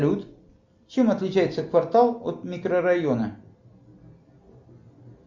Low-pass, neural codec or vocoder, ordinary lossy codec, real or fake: 7.2 kHz; none; MP3, 64 kbps; real